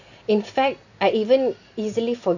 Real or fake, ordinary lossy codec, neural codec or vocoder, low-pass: real; none; none; 7.2 kHz